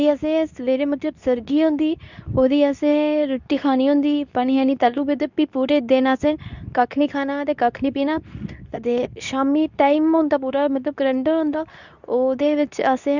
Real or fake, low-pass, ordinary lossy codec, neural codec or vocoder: fake; 7.2 kHz; none; codec, 24 kHz, 0.9 kbps, WavTokenizer, medium speech release version 2